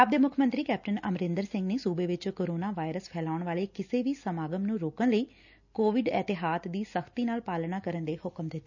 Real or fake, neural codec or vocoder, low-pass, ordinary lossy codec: real; none; 7.2 kHz; none